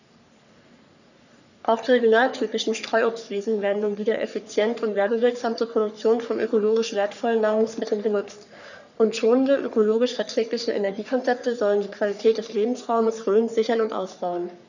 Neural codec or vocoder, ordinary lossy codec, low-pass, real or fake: codec, 44.1 kHz, 3.4 kbps, Pupu-Codec; none; 7.2 kHz; fake